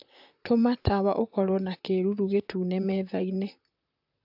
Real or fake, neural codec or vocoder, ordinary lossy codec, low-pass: fake; vocoder, 44.1 kHz, 128 mel bands, Pupu-Vocoder; AAC, 48 kbps; 5.4 kHz